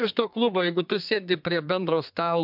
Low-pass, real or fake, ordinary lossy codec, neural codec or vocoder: 5.4 kHz; fake; MP3, 48 kbps; codec, 32 kHz, 1.9 kbps, SNAC